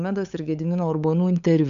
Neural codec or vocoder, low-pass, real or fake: codec, 16 kHz, 8 kbps, FunCodec, trained on LibriTTS, 25 frames a second; 7.2 kHz; fake